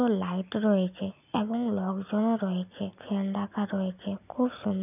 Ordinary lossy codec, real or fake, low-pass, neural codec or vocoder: none; real; 3.6 kHz; none